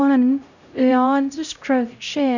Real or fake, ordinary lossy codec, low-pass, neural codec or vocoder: fake; none; 7.2 kHz; codec, 16 kHz, 0.5 kbps, X-Codec, HuBERT features, trained on LibriSpeech